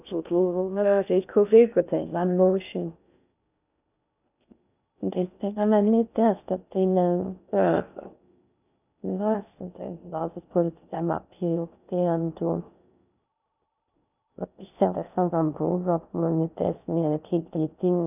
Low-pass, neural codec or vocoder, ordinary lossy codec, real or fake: 3.6 kHz; codec, 16 kHz in and 24 kHz out, 0.6 kbps, FocalCodec, streaming, 2048 codes; none; fake